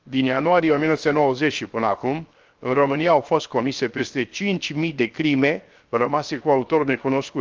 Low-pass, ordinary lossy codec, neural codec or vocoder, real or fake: 7.2 kHz; Opus, 24 kbps; codec, 16 kHz, 0.7 kbps, FocalCodec; fake